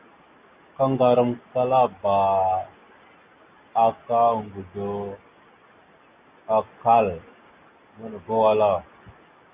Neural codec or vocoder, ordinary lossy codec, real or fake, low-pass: none; Opus, 64 kbps; real; 3.6 kHz